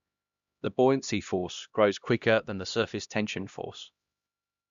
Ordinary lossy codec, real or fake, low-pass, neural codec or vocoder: Opus, 64 kbps; fake; 7.2 kHz; codec, 16 kHz, 1 kbps, X-Codec, HuBERT features, trained on LibriSpeech